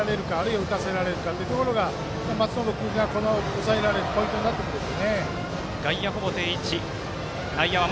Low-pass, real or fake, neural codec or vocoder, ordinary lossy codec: none; real; none; none